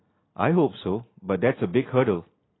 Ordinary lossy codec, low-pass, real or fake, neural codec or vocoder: AAC, 16 kbps; 7.2 kHz; real; none